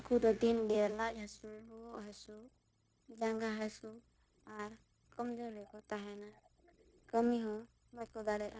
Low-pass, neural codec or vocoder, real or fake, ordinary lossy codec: none; codec, 16 kHz, 0.9 kbps, LongCat-Audio-Codec; fake; none